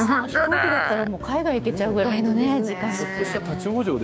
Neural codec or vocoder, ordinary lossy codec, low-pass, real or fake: codec, 16 kHz, 6 kbps, DAC; none; none; fake